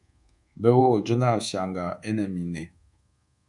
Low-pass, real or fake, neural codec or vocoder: 10.8 kHz; fake; codec, 24 kHz, 1.2 kbps, DualCodec